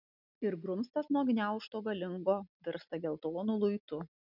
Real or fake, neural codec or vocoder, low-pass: real; none; 5.4 kHz